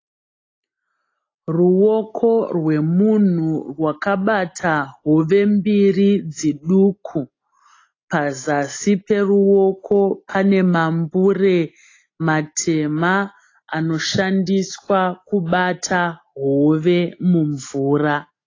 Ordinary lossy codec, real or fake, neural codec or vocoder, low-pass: AAC, 32 kbps; real; none; 7.2 kHz